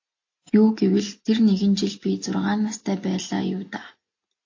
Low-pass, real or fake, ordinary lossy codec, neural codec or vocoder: 7.2 kHz; real; AAC, 48 kbps; none